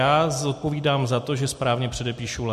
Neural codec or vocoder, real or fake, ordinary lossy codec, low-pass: none; real; MP3, 64 kbps; 14.4 kHz